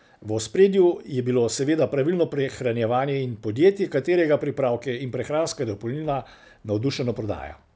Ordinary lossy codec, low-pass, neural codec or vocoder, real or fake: none; none; none; real